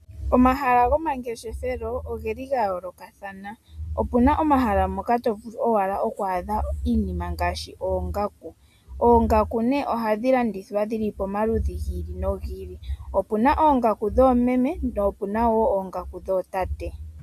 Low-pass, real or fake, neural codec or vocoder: 14.4 kHz; real; none